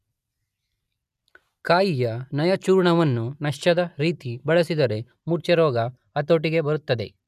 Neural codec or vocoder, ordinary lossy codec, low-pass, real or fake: none; none; 14.4 kHz; real